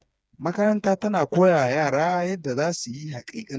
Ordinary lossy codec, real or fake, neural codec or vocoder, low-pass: none; fake; codec, 16 kHz, 4 kbps, FreqCodec, smaller model; none